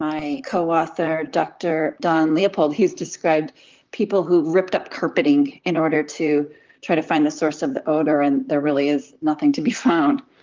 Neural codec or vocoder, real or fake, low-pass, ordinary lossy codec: vocoder, 44.1 kHz, 128 mel bands, Pupu-Vocoder; fake; 7.2 kHz; Opus, 24 kbps